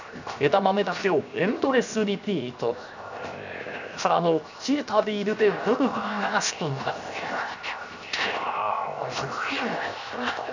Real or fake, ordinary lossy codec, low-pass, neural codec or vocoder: fake; none; 7.2 kHz; codec, 16 kHz, 0.7 kbps, FocalCodec